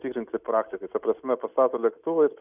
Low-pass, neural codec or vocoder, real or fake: 3.6 kHz; none; real